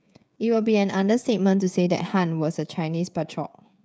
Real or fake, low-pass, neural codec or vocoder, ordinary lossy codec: fake; none; codec, 16 kHz, 16 kbps, FreqCodec, smaller model; none